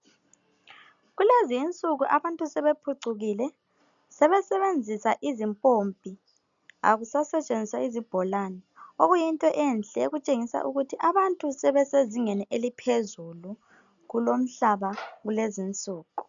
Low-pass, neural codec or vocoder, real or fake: 7.2 kHz; none; real